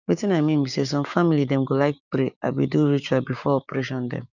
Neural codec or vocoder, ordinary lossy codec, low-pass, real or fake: codec, 16 kHz, 6 kbps, DAC; none; 7.2 kHz; fake